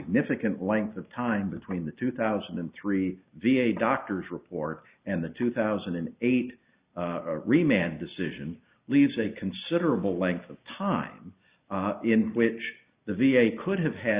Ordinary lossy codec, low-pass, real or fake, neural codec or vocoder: Opus, 64 kbps; 3.6 kHz; real; none